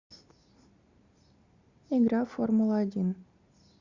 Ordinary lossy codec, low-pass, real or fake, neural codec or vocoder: Opus, 64 kbps; 7.2 kHz; real; none